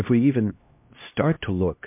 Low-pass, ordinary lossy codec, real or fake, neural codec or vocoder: 3.6 kHz; MP3, 32 kbps; fake; codec, 16 kHz, 1 kbps, X-Codec, HuBERT features, trained on LibriSpeech